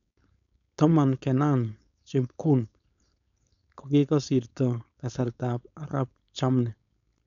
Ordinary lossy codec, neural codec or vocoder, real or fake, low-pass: none; codec, 16 kHz, 4.8 kbps, FACodec; fake; 7.2 kHz